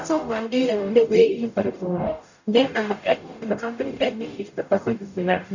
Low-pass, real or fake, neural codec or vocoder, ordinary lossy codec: 7.2 kHz; fake; codec, 44.1 kHz, 0.9 kbps, DAC; none